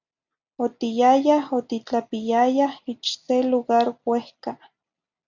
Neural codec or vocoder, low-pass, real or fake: none; 7.2 kHz; real